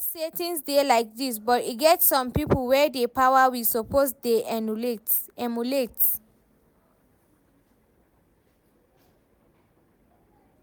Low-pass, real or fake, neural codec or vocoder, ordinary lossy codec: none; real; none; none